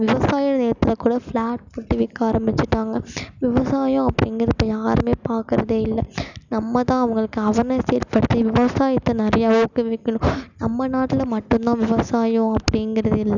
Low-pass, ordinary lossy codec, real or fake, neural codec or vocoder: 7.2 kHz; none; real; none